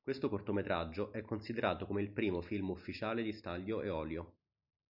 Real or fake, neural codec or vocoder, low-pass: real; none; 5.4 kHz